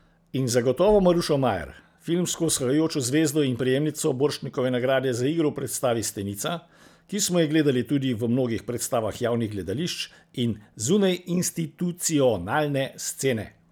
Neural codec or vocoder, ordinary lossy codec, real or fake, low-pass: none; none; real; none